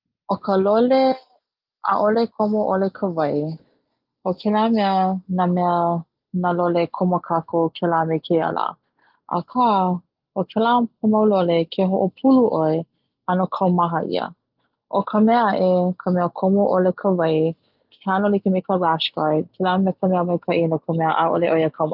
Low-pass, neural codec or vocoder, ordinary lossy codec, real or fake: 5.4 kHz; none; Opus, 32 kbps; real